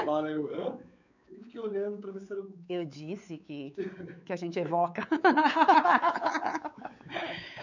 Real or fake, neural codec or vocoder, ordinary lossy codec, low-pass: fake; codec, 16 kHz, 4 kbps, X-Codec, WavLM features, trained on Multilingual LibriSpeech; none; 7.2 kHz